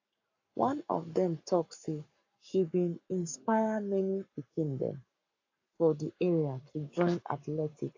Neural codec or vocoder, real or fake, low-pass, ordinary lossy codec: codec, 44.1 kHz, 7.8 kbps, Pupu-Codec; fake; 7.2 kHz; AAC, 48 kbps